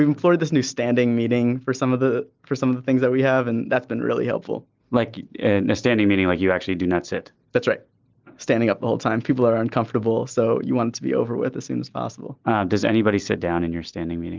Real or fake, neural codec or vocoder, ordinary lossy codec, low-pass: real; none; Opus, 32 kbps; 7.2 kHz